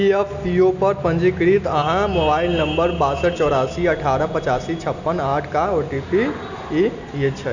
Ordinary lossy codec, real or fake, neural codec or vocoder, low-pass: none; real; none; 7.2 kHz